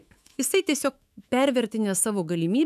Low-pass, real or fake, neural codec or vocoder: 14.4 kHz; fake; autoencoder, 48 kHz, 128 numbers a frame, DAC-VAE, trained on Japanese speech